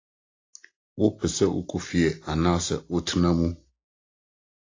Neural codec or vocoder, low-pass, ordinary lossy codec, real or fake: none; 7.2 kHz; AAC, 32 kbps; real